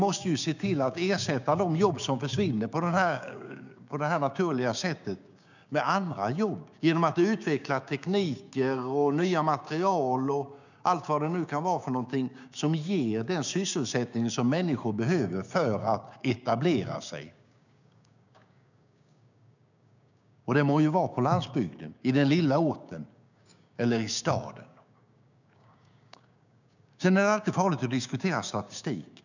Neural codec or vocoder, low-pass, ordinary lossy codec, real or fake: codec, 16 kHz, 6 kbps, DAC; 7.2 kHz; none; fake